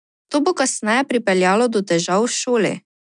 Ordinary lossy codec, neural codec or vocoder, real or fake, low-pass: none; none; real; 9.9 kHz